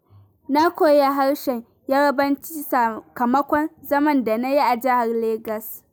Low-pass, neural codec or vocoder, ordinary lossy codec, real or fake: none; none; none; real